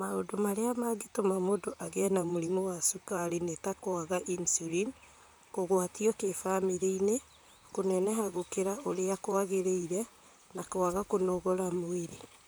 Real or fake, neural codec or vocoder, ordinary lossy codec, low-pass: fake; vocoder, 44.1 kHz, 128 mel bands, Pupu-Vocoder; none; none